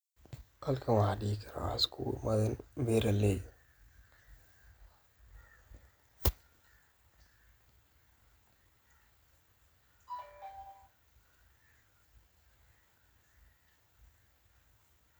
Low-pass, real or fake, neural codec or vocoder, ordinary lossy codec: none; real; none; none